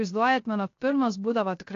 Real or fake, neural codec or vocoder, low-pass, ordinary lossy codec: fake; codec, 16 kHz, 0.3 kbps, FocalCodec; 7.2 kHz; AAC, 48 kbps